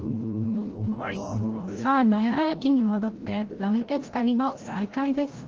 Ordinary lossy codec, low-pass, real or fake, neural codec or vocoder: Opus, 16 kbps; 7.2 kHz; fake; codec, 16 kHz, 0.5 kbps, FreqCodec, larger model